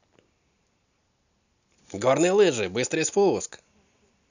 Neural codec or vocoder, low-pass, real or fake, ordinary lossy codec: none; 7.2 kHz; real; none